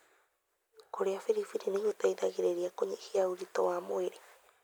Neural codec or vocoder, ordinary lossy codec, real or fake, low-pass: none; none; real; none